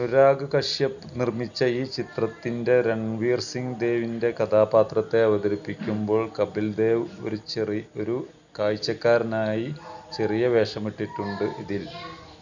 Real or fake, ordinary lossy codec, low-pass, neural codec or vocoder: real; none; 7.2 kHz; none